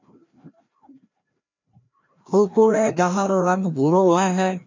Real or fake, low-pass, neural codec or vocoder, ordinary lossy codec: fake; 7.2 kHz; codec, 16 kHz, 1 kbps, FreqCodec, larger model; MP3, 64 kbps